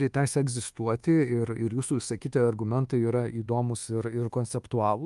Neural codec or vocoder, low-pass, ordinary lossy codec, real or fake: codec, 24 kHz, 1.2 kbps, DualCodec; 10.8 kHz; Opus, 32 kbps; fake